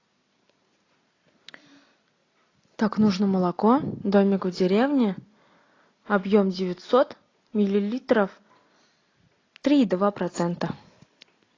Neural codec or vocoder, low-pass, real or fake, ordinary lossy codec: none; 7.2 kHz; real; AAC, 32 kbps